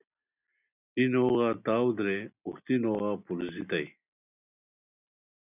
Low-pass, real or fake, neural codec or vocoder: 3.6 kHz; real; none